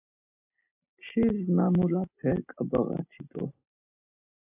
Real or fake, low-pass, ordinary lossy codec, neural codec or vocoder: real; 3.6 kHz; AAC, 24 kbps; none